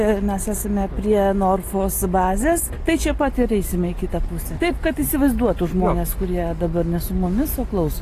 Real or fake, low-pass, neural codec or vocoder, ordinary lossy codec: real; 14.4 kHz; none; AAC, 48 kbps